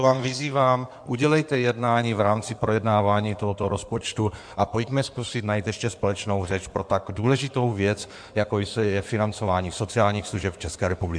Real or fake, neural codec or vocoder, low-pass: fake; codec, 16 kHz in and 24 kHz out, 2.2 kbps, FireRedTTS-2 codec; 9.9 kHz